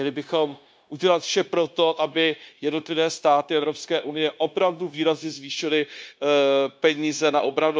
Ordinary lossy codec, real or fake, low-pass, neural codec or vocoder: none; fake; none; codec, 16 kHz, 0.9 kbps, LongCat-Audio-Codec